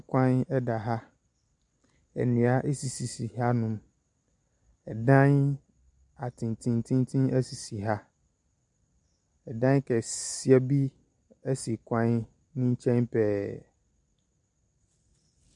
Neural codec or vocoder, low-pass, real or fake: none; 10.8 kHz; real